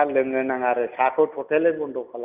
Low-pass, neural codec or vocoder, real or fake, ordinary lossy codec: 3.6 kHz; none; real; none